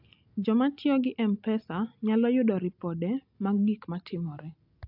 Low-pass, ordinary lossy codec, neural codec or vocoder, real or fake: 5.4 kHz; AAC, 48 kbps; none; real